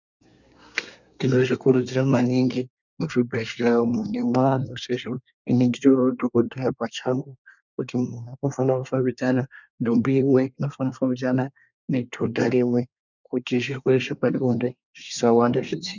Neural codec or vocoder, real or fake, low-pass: codec, 24 kHz, 1 kbps, SNAC; fake; 7.2 kHz